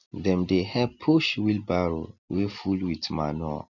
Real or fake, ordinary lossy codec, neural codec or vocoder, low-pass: real; none; none; 7.2 kHz